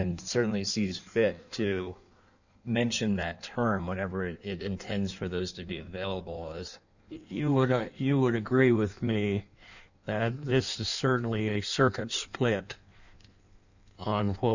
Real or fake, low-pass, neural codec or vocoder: fake; 7.2 kHz; codec, 16 kHz in and 24 kHz out, 1.1 kbps, FireRedTTS-2 codec